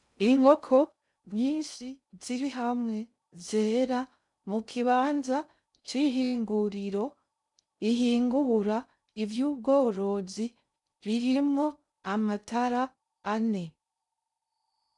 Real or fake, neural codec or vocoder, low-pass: fake; codec, 16 kHz in and 24 kHz out, 0.6 kbps, FocalCodec, streaming, 2048 codes; 10.8 kHz